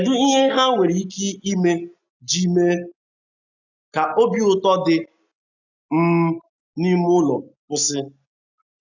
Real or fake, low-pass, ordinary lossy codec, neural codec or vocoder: real; 7.2 kHz; none; none